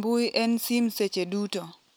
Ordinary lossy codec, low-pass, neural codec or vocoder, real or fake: none; none; none; real